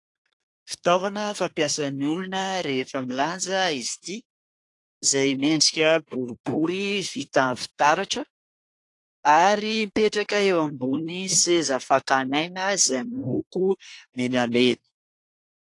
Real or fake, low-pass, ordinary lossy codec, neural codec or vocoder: fake; 10.8 kHz; AAC, 48 kbps; codec, 24 kHz, 1 kbps, SNAC